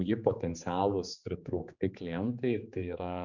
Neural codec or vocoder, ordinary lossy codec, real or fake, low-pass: codec, 16 kHz, 4 kbps, X-Codec, HuBERT features, trained on general audio; Opus, 64 kbps; fake; 7.2 kHz